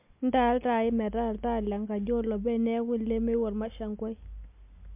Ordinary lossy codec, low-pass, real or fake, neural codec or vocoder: MP3, 32 kbps; 3.6 kHz; real; none